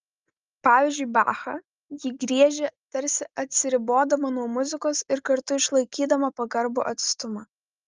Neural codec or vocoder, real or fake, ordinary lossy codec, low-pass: none; real; Opus, 24 kbps; 7.2 kHz